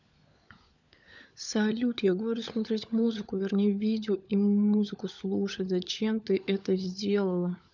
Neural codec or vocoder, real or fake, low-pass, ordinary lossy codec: codec, 16 kHz, 16 kbps, FunCodec, trained on LibriTTS, 50 frames a second; fake; 7.2 kHz; none